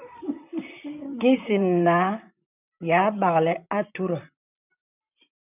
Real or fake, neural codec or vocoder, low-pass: fake; vocoder, 44.1 kHz, 128 mel bands, Pupu-Vocoder; 3.6 kHz